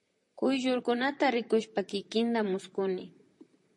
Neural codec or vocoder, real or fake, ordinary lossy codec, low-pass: vocoder, 44.1 kHz, 128 mel bands, Pupu-Vocoder; fake; MP3, 48 kbps; 10.8 kHz